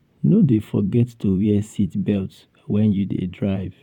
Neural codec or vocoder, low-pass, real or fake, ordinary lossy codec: vocoder, 44.1 kHz, 128 mel bands, Pupu-Vocoder; 19.8 kHz; fake; none